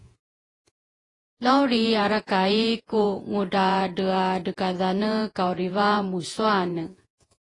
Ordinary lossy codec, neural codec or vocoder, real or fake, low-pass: AAC, 32 kbps; vocoder, 48 kHz, 128 mel bands, Vocos; fake; 10.8 kHz